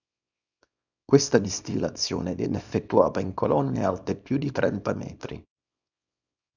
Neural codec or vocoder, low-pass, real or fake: codec, 24 kHz, 0.9 kbps, WavTokenizer, small release; 7.2 kHz; fake